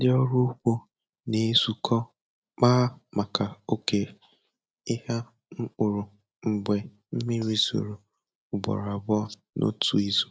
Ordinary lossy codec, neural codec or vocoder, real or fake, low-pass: none; none; real; none